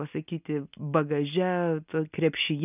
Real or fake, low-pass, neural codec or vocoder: real; 3.6 kHz; none